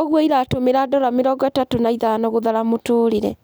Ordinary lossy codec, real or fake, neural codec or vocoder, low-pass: none; real; none; none